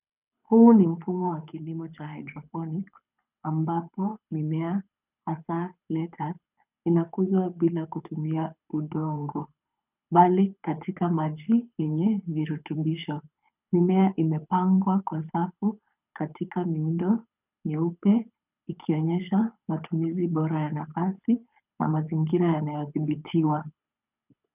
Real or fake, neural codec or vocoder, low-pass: fake; codec, 24 kHz, 6 kbps, HILCodec; 3.6 kHz